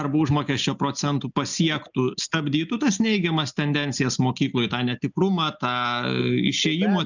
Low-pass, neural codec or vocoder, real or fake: 7.2 kHz; none; real